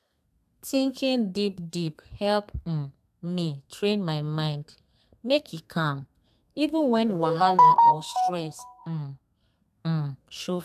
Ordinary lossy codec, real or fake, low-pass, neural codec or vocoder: AAC, 96 kbps; fake; 14.4 kHz; codec, 32 kHz, 1.9 kbps, SNAC